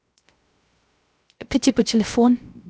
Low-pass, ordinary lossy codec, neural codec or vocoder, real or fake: none; none; codec, 16 kHz, 0.3 kbps, FocalCodec; fake